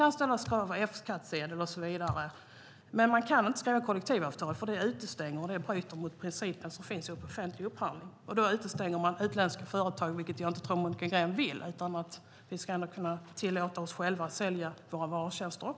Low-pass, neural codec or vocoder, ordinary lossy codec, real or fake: none; none; none; real